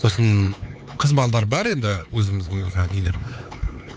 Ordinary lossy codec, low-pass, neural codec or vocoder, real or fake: none; none; codec, 16 kHz, 4 kbps, X-Codec, HuBERT features, trained on LibriSpeech; fake